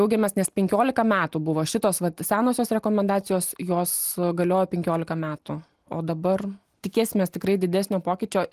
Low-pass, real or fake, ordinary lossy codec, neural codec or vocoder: 14.4 kHz; real; Opus, 24 kbps; none